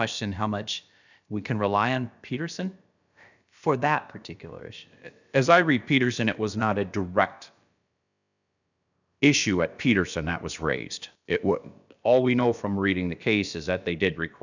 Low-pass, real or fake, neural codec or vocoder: 7.2 kHz; fake; codec, 16 kHz, about 1 kbps, DyCAST, with the encoder's durations